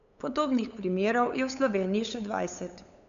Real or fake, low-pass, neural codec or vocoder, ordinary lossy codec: fake; 7.2 kHz; codec, 16 kHz, 8 kbps, FunCodec, trained on LibriTTS, 25 frames a second; none